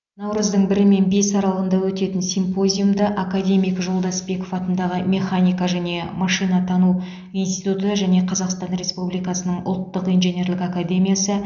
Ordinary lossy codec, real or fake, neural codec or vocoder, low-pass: none; real; none; 7.2 kHz